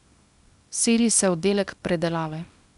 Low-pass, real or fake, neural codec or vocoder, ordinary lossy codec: 10.8 kHz; fake; codec, 24 kHz, 0.9 kbps, WavTokenizer, small release; none